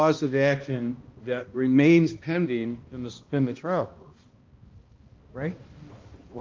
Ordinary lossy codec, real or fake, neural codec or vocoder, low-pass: Opus, 24 kbps; fake; codec, 16 kHz, 1 kbps, X-Codec, HuBERT features, trained on balanced general audio; 7.2 kHz